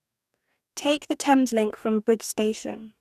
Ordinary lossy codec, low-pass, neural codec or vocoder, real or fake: none; 14.4 kHz; codec, 44.1 kHz, 2.6 kbps, DAC; fake